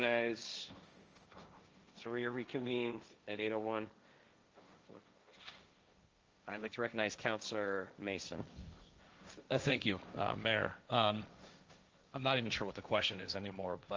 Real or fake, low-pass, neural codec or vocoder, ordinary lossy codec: fake; 7.2 kHz; codec, 16 kHz, 1.1 kbps, Voila-Tokenizer; Opus, 24 kbps